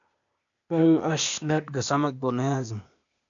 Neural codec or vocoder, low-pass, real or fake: codec, 16 kHz, 0.8 kbps, ZipCodec; 7.2 kHz; fake